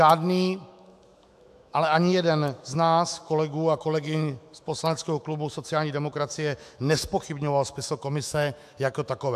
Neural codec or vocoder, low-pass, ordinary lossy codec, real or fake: autoencoder, 48 kHz, 128 numbers a frame, DAC-VAE, trained on Japanese speech; 14.4 kHz; AAC, 96 kbps; fake